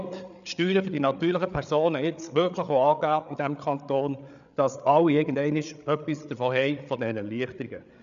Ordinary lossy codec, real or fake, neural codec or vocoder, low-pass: none; fake; codec, 16 kHz, 8 kbps, FreqCodec, larger model; 7.2 kHz